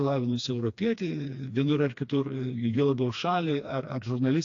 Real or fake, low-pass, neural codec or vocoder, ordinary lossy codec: fake; 7.2 kHz; codec, 16 kHz, 2 kbps, FreqCodec, smaller model; AAC, 48 kbps